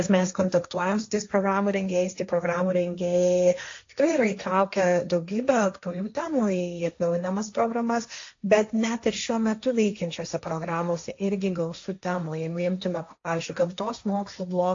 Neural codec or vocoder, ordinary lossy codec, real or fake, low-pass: codec, 16 kHz, 1.1 kbps, Voila-Tokenizer; AAC, 48 kbps; fake; 7.2 kHz